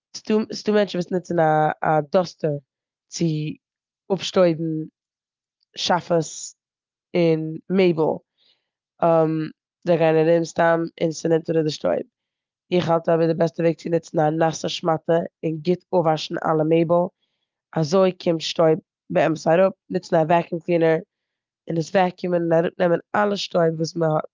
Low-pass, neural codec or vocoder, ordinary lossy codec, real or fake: 7.2 kHz; none; Opus, 24 kbps; real